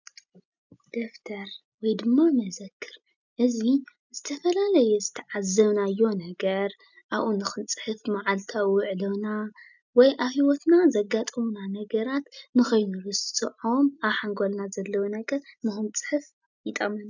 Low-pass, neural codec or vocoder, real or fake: 7.2 kHz; none; real